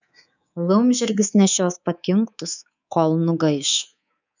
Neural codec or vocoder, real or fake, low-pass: codec, 24 kHz, 3.1 kbps, DualCodec; fake; 7.2 kHz